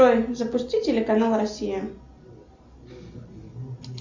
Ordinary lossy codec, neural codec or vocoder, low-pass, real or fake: Opus, 64 kbps; none; 7.2 kHz; real